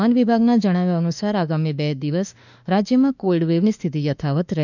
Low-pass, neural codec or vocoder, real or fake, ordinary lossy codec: 7.2 kHz; autoencoder, 48 kHz, 32 numbers a frame, DAC-VAE, trained on Japanese speech; fake; none